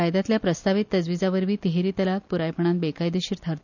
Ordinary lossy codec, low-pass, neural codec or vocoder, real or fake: none; 7.2 kHz; none; real